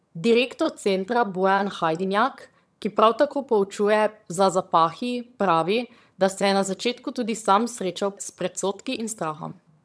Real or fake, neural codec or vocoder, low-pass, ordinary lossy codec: fake; vocoder, 22.05 kHz, 80 mel bands, HiFi-GAN; none; none